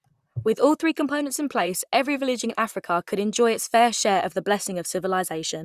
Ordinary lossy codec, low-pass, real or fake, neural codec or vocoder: Opus, 64 kbps; 14.4 kHz; fake; vocoder, 44.1 kHz, 128 mel bands, Pupu-Vocoder